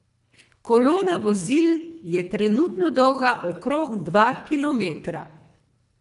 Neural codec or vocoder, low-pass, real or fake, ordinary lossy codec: codec, 24 kHz, 1.5 kbps, HILCodec; 10.8 kHz; fake; none